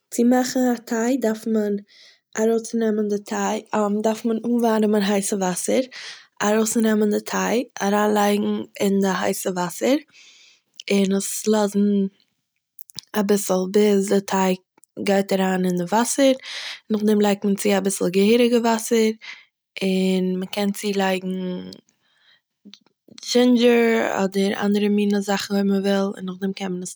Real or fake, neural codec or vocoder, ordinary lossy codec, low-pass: real; none; none; none